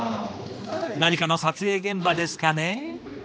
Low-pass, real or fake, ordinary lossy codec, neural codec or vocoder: none; fake; none; codec, 16 kHz, 2 kbps, X-Codec, HuBERT features, trained on general audio